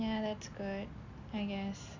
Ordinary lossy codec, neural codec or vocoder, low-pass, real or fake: Opus, 64 kbps; none; 7.2 kHz; real